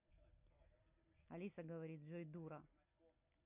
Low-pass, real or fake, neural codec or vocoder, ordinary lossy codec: 3.6 kHz; real; none; none